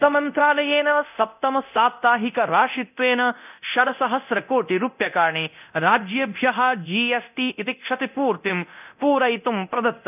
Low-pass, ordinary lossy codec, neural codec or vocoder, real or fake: 3.6 kHz; none; codec, 24 kHz, 0.9 kbps, DualCodec; fake